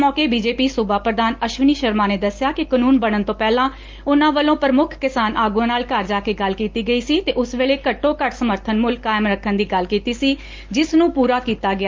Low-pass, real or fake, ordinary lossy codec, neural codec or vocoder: 7.2 kHz; real; Opus, 32 kbps; none